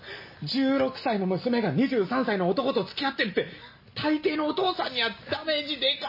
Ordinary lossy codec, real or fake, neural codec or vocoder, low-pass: MP3, 24 kbps; real; none; 5.4 kHz